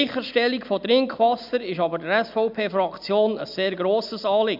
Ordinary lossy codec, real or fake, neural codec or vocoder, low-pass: none; real; none; 5.4 kHz